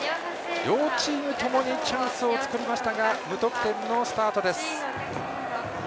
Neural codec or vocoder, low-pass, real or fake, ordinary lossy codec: none; none; real; none